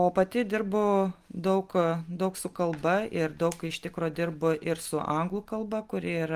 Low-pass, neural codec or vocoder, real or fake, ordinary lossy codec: 14.4 kHz; none; real; Opus, 32 kbps